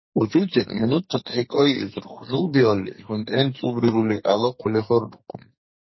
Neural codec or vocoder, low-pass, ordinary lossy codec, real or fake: codec, 44.1 kHz, 2.6 kbps, SNAC; 7.2 kHz; MP3, 24 kbps; fake